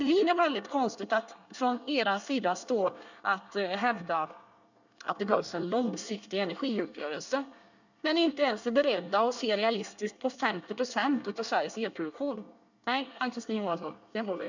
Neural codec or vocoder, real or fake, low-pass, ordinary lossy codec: codec, 24 kHz, 1 kbps, SNAC; fake; 7.2 kHz; none